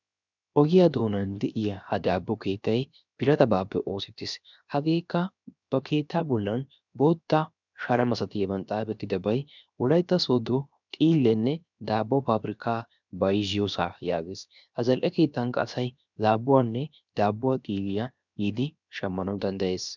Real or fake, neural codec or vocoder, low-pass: fake; codec, 16 kHz, 0.7 kbps, FocalCodec; 7.2 kHz